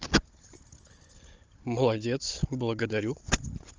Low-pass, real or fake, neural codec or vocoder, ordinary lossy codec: 7.2 kHz; fake; codec, 16 kHz, 16 kbps, FunCodec, trained on Chinese and English, 50 frames a second; Opus, 24 kbps